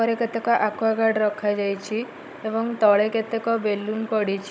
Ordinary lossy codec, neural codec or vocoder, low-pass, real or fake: none; codec, 16 kHz, 16 kbps, FunCodec, trained on Chinese and English, 50 frames a second; none; fake